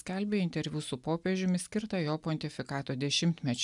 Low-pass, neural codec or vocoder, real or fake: 10.8 kHz; none; real